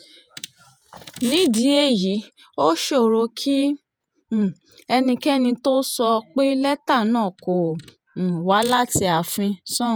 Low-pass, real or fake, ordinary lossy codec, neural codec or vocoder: none; fake; none; vocoder, 48 kHz, 128 mel bands, Vocos